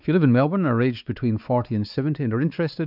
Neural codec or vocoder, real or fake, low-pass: codec, 16 kHz, 4 kbps, X-Codec, WavLM features, trained on Multilingual LibriSpeech; fake; 5.4 kHz